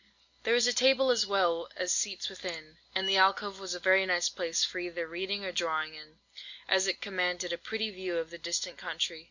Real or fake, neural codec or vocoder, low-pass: real; none; 7.2 kHz